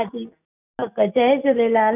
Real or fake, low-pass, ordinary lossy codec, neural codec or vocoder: real; 3.6 kHz; none; none